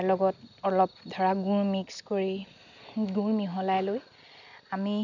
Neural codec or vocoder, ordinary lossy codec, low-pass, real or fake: none; none; 7.2 kHz; real